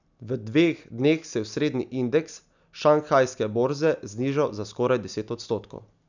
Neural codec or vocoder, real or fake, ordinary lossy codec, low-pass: none; real; none; 7.2 kHz